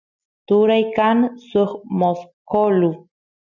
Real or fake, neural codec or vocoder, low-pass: real; none; 7.2 kHz